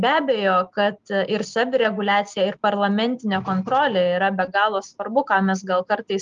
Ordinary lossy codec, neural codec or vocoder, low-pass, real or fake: Opus, 32 kbps; none; 7.2 kHz; real